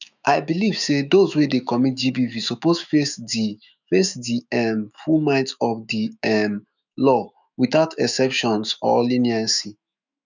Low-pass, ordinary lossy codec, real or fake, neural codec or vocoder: 7.2 kHz; none; fake; autoencoder, 48 kHz, 128 numbers a frame, DAC-VAE, trained on Japanese speech